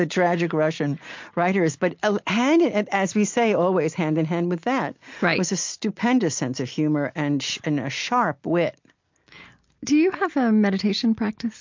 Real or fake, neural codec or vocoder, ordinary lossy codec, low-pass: real; none; MP3, 48 kbps; 7.2 kHz